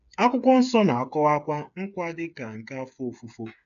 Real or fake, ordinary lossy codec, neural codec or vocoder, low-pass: fake; none; codec, 16 kHz, 8 kbps, FreqCodec, smaller model; 7.2 kHz